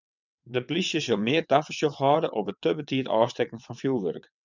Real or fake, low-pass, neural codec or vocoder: fake; 7.2 kHz; vocoder, 22.05 kHz, 80 mel bands, WaveNeXt